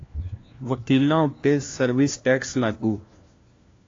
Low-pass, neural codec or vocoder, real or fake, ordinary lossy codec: 7.2 kHz; codec, 16 kHz, 1 kbps, FunCodec, trained on LibriTTS, 50 frames a second; fake; AAC, 32 kbps